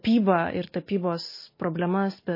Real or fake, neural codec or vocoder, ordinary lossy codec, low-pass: real; none; MP3, 24 kbps; 5.4 kHz